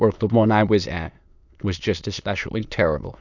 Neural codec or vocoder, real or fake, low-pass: autoencoder, 22.05 kHz, a latent of 192 numbers a frame, VITS, trained on many speakers; fake; 7.2 kHz